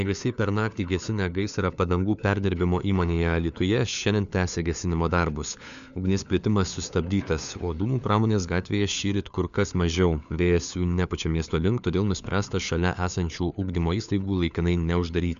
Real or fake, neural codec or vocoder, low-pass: fake; codec, 16 kHz, 4 kbps, FunCodec, trained on LibriTTS, 50 frames a second; 7.2 kHz